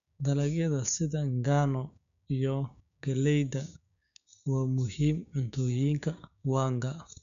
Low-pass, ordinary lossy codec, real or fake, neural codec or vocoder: 7.2 kHz; none; fake; codec, 16 kHz, 6 kbps, DAC